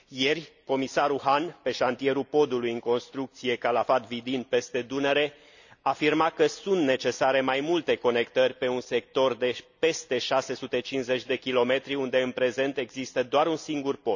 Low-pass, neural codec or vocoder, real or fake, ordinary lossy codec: 7.2 kHz; none; real; none